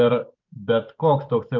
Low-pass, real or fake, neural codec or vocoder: 7.2 kHz; fake; vocoder, 44.1 kHz, 128 mel bands every 512 samples, BigVGAN v2